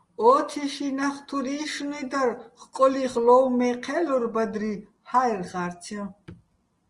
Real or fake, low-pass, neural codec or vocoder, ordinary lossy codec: real; 10.8 kHz; none; Opus, 24 kbps